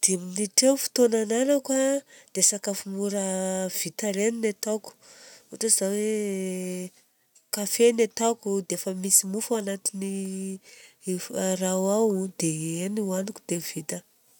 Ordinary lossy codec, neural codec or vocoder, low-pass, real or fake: none; none; none; real